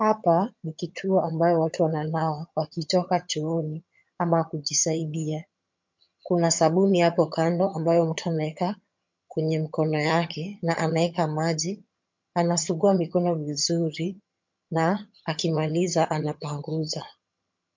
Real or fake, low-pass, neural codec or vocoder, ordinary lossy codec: fake; 7.2 kHz; vocoder, 22.05 kHz, 80 mel bands, HiFi-GAN; MP3, 48 kbps